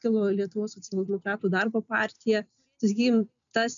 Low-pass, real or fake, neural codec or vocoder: 7.2 kHz; real; none